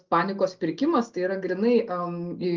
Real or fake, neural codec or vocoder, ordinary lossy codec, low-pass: real; none; Opus, 24 kbps; 7.2 kHz